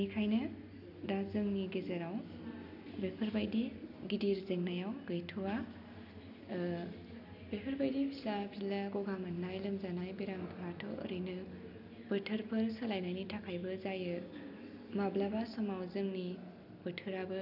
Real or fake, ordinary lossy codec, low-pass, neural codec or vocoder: real; none; 5.4 kHz; none